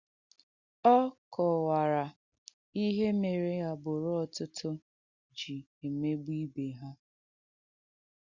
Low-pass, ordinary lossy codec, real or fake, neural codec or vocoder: 7.2 kHz; Opus, 64 kbps; real; none